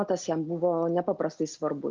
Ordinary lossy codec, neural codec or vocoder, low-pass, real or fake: Opus, 32 kbps; none; 7.2 kHz; real